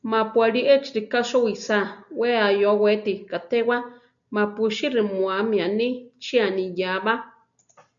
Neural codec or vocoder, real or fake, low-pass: none; real; 7.2 kHz